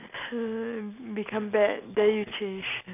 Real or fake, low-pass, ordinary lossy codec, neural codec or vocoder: real; 3.6 kHz; AAC, 32 kbps; none